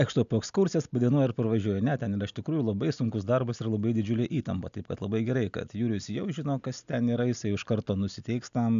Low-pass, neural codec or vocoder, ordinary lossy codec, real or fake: 7.2 kHz; none; AAC, 96 kbps; real